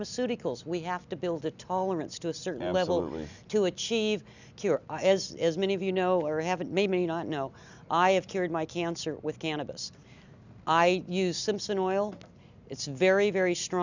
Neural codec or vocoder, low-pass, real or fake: none; 7.2 kHz; real